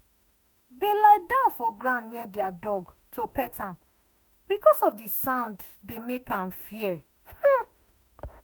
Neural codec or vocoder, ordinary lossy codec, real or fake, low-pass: autoencoder, 48 kHz, 32 numbers a frame, DAC-VAE, trained on Japanese speech; none; fake; none